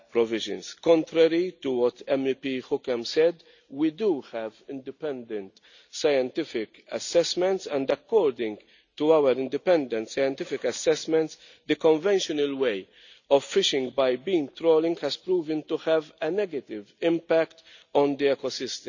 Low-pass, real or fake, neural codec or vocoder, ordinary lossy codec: 7.2 kHz; real; none; none